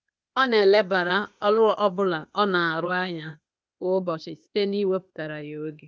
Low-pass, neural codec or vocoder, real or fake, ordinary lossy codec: none; codec, 16 kHz, 0.8 kbps, ZipCodec; fake; none